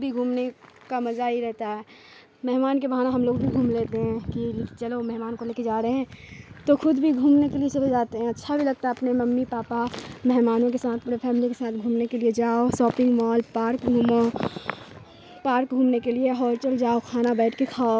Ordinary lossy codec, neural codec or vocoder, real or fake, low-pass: none; none; real; none